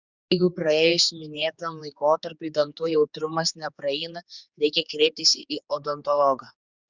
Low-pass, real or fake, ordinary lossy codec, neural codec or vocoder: 7.2 kHz; fake; Opus, 64 kbps; codec, 16 kHz, 4 kbps, X-Codec, HuBERT features, trained on general audio